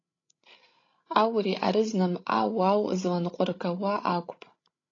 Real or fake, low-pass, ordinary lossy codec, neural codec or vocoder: fake; 7.2 kHz; AAC, 32 kbps; codec, 16 kHz, 16 kbps, FreqCodec, larger model